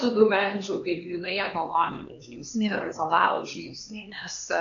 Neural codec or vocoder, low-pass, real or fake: codec, 16 kHz, 2 kbps, X-Codec, HuBERT features, trained on LibriSpeech; 7.2 kHz; fake